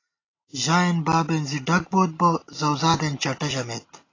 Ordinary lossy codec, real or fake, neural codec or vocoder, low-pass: AAC, 32 kbps; real; none; 7.2 kHz